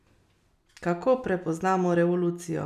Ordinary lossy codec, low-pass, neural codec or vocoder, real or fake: none; 14.4 kHz; none; real